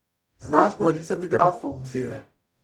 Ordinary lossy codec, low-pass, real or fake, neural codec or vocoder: none; 19.8 kHz; fake; codec, 44.1 kHz, 0.9 kbps, DAC